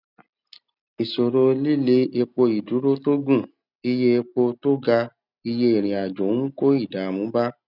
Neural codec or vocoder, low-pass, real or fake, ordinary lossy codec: none; 5.4 kHz; real; none